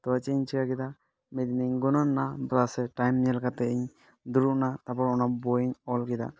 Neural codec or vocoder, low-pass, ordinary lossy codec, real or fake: none; none; none; real